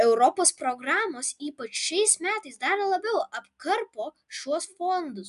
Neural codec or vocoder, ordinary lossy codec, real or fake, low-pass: none; AAC, 96 kbps; real; 10.8 kHz